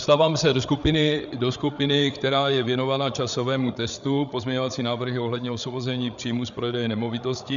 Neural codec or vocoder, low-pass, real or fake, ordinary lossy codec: codec, 16 kHz, 8 kbps, FreqCodec, larger model; 7.2 kHz; fake; MP3, 96 kbps